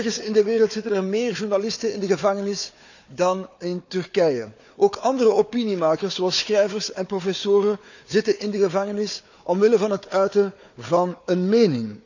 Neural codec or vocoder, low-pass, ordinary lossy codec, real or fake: codec, 16 kHz, 4 kbps, FunCodec, trained on Chinese and English, 50 frames a second; 7.2 kHz; none; fake